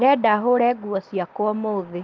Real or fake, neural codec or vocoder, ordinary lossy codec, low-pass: real; none; Opus, 32 kbps; 7.2 kHz